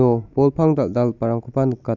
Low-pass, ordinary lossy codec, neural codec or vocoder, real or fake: 7.2 kHz; none; none; real